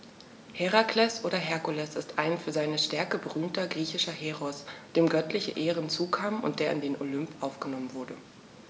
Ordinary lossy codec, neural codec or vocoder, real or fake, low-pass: none; none; real; none